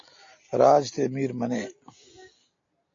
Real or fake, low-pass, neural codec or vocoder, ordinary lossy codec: real; 7.2 kHz; none; AAC, 48 kbps